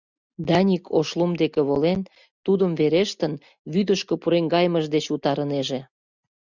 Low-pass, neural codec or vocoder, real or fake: 7.2 kHz; none; real